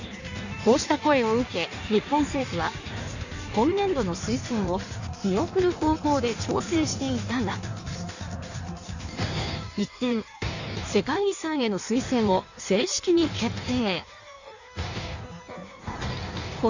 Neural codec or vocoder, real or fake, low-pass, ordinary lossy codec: codec, 16 kHz in and 24 kHz out, 1.1 kbps, FireRedTTS-2 codec; fake; 7.2 kHz; none